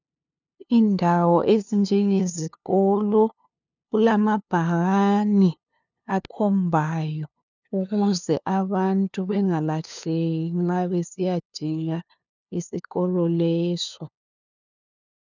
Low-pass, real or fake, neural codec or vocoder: 7.2 kHz; fake; codec, 16 kHz, 2 kbps, FunCodec, trained on LibriTTS, 25 frames a second